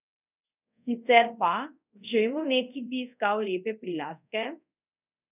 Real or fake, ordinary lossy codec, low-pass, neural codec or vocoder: fake; none; 3.6 kHz; codec, 24 kHz, 0.5 kbps, DualCodec